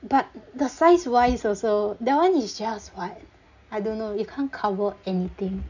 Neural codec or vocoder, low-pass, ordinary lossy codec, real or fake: none; 7.2 kHz; none; real